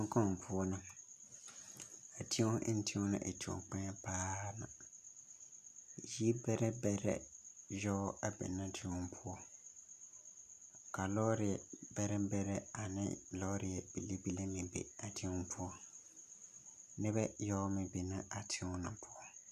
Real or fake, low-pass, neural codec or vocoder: real; 14.4 kHz; none